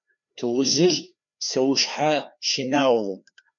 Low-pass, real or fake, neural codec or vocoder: 7.2 kHz; fake; codec, 16 kHz, 2 kbps, FreqCodec, larger model